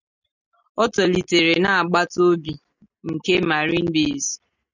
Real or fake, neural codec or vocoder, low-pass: real; none; 7.2 kHz